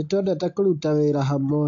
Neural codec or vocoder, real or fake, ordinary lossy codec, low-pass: none; real; AAC, 48 kbps; 7.2 kHz